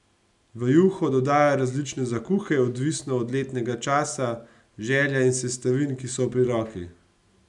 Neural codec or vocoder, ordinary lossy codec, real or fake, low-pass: none; none; real; 10.8 kHz